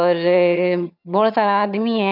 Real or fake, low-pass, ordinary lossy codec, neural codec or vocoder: fake; 5.4 kHz; none; vocoder, 22.05 kHz, 80 mel bands, HiFi-GAN